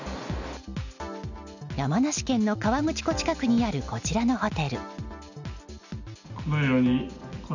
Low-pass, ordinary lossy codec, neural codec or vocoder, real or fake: 7.2 kHz; none; none; real